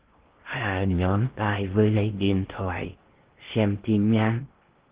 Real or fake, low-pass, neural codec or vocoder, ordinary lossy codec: fake; 3.6 kHz; codec, 16 kHz in and 24 kHz out, 0.6 kbps, FocalCodec, streaming, 2048 codes; Opus, 16 kbps